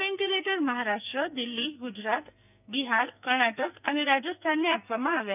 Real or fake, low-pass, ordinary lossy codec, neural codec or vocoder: fake; 3.6 kHz; none; codec, 44.1 kHz, 2.6 kbps, SNAC